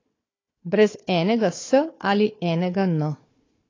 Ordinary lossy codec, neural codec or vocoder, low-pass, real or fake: AAC, 32 kbps; codec, 16 kHz, 4 kbps, FunCodec, trained on Chinese and English, 50 frames a second; 7.2 kHz; fake